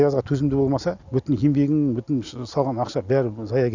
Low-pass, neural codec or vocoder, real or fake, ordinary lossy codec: 7.2 kHz; none; real; none